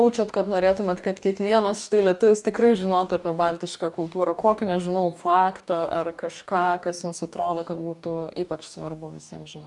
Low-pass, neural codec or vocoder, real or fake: 10.8 kHz; codec, 44.1 kHz, 2.6 kbps, DAC; fake